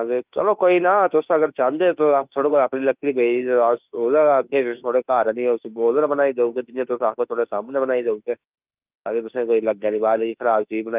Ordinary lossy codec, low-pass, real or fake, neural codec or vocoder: Opus, 24 kbps; 3.6 kHz; fake; autoencoder, 48 kHz, 32 numbers a frame, DAC-VAE, trained on Japanese speech